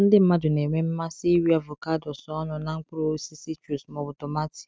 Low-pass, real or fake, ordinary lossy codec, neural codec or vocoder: none; real; none; none